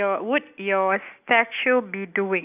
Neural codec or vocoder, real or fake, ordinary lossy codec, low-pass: none; real; none; 3.6 kHz